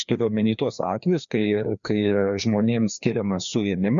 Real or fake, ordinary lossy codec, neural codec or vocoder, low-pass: fake; MP3, 96 kbps; codec, 16 kHz, 2 kbps, FreqCodec, larger model; 7.2 kHz